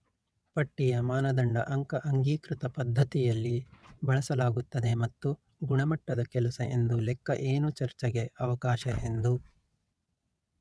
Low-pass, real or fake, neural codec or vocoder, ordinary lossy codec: none; fake; vocoder, 22.05 kHz, 80 mel bands, WaveNeXt; none